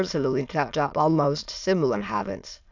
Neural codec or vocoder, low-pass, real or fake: autoencoder, 22.05 kHz, a latent of 192 numbers a frame, VITS, trained on many speakers; 7.2 kHz; fake